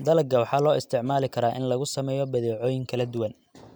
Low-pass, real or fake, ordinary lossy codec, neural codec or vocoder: none; real; none; none